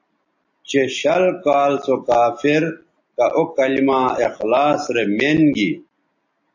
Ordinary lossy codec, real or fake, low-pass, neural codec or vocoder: AAC, 48 kbps; real; 7.2 kHz; none